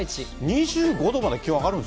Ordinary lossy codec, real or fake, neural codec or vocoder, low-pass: none; real; none; none